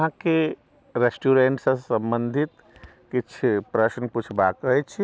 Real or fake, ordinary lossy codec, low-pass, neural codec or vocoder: real; none; none; none